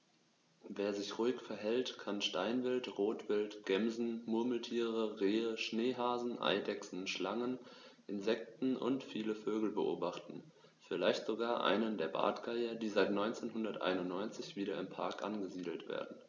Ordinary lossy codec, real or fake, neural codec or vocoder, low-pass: none; real; none; 7.2 kHz